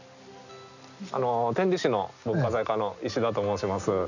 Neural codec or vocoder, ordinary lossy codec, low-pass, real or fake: none; none; 7.2 kHz; real